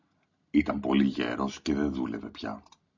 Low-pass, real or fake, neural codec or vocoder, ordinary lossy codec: 7.2 kHz; real; none; AAC, 48 kbps